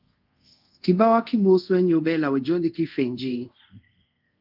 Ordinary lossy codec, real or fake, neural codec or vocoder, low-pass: Opus, 32 kbps; fake; codec, 24 kHz, 0.5 kbps, DualCodec; 5.4 kHz